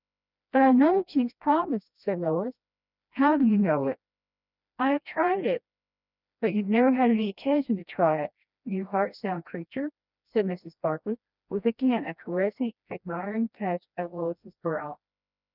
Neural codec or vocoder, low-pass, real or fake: codec, 16 kHz, 1 kbps, FreqCodec, smaller model; 5.4 kHz; fake